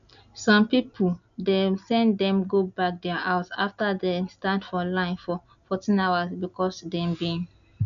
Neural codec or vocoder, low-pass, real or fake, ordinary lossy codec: none; 7.2 kHz; real; none